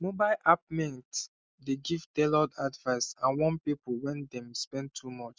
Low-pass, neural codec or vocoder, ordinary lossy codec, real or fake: none; none; none; real